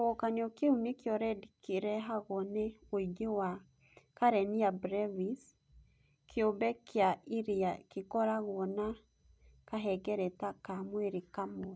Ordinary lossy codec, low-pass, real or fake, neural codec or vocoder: none; none; real; none